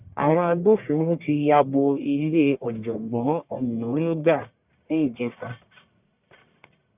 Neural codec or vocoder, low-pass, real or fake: codec, 44.1 kHz, 1.7 kbps, Pupu-Codec; 3.6 kHz; fake